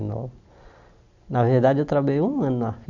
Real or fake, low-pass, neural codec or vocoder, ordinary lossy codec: real; 7.2 kHz; none; none